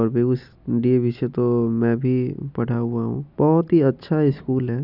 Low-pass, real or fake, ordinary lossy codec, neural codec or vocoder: 5.4 kHz; real; none; none